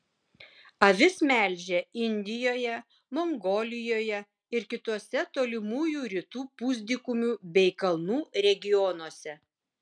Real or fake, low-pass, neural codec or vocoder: real; 9.9 kHz; none